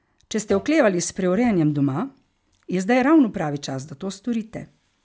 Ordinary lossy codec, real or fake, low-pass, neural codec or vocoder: none; real; none; none